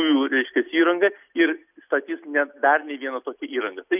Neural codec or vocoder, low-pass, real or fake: none; 3.6 kHz; real